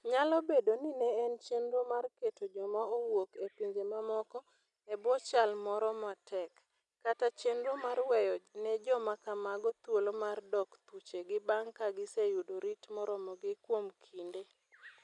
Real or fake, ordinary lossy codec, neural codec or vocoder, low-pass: real; none; none; 10.8 kHz